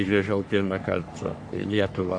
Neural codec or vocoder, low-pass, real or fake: codec, 44.1 kHz, 3.4 kbps, Pupu-Codec; 9.9 kHz; fake